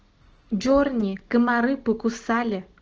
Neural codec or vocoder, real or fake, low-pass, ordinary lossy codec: none; real; 7.2 kHz; Opus, 16 kbps